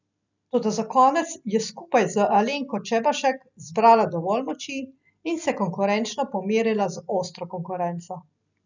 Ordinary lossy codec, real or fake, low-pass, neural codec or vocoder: none; real; 7.2 kHz; none